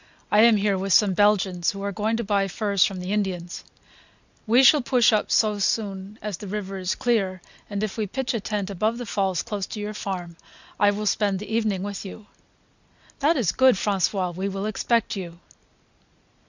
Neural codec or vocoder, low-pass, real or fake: none; 7.2 kHz; real